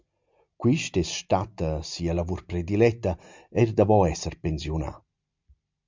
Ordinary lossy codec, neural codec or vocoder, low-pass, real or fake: MP3, 64 kbps; none; 7.2 kHz; real